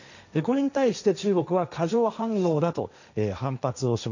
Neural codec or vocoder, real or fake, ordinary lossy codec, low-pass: codec, 16 kHz, 1.1 kbps, Voila-Tokenizer; fake; none; 7.2 kHz